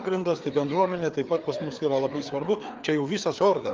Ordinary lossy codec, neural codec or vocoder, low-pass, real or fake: Opus, 24 kbps; codec, 16 kHz, 4 kbps, FreqCodec, larger model; 7.2 kHz; fake